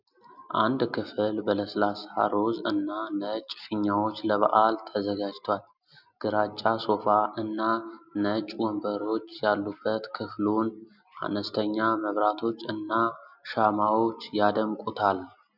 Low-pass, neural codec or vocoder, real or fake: 5.4 kHz; none; real